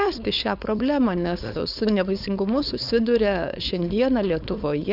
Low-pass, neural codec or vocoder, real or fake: 5.4 kHz; codec, 16 kHz, 4.8 kbps, FACodec; fake